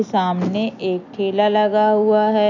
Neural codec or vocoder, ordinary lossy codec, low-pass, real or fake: none; none; 7.2 kHz; real